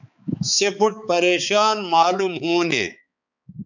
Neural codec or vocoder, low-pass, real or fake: codec, 16 kHz, 4 kbps, X-Codec, HuBERT features, trained on balanced general audio; 7.2 kHz; fake